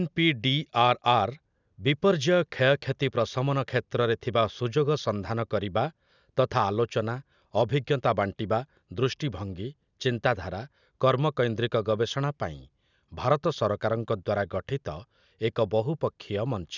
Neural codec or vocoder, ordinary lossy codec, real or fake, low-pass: none; none; real; 7.2 kHz